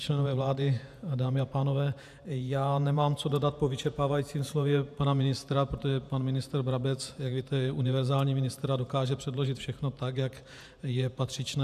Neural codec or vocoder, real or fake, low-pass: vocoder, 48 kHz, 128 mel bands, Vocos; fake; 14.4 kHz